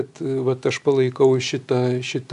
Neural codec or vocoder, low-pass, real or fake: none; 10.8 kHz; real